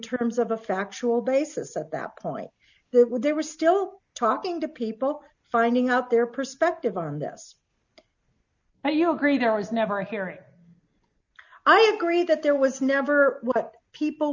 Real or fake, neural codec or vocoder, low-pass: real; none; 7.2 kHz